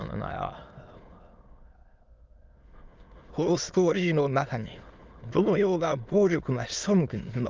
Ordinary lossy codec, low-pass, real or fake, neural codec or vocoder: Opus, 24 kbps; 7.2 kHz; fake; autoencoder, 22.05 kHz, a latent of 192 numbers a frame, VITS, trained on many speakers